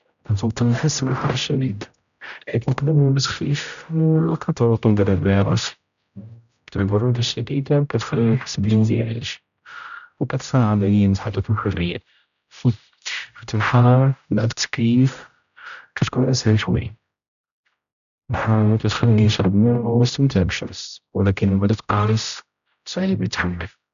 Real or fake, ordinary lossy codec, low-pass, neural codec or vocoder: fake; none; 7.2 kHz; codec, 16 kHz, 0.5 kbps, X-Codec, HuBERT features, trained on general audio